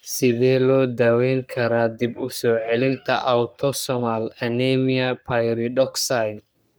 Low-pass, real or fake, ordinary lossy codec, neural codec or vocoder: none; fake; none; codec, 44.1 kHz, 3.4 kbps, Pupu-Codec